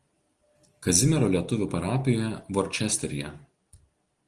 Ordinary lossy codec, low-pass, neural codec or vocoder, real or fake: Opus, 24 kbps; 10.8 kHz; none; real